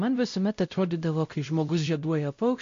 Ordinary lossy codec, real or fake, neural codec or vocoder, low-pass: MP3, 48 kbps; fake; codec, 16 kHz, 0.5 kbps, X-Codec, WavLM features, trained on Multilingual LibriSpeech; 7.2 kHz